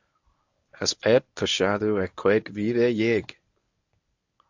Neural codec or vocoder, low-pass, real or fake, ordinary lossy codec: codec, 24 kHz, 0.9 kbps, WavTokenizer, medium speech release version 1; 7.2 kHz; fake; AAC, 48 kbps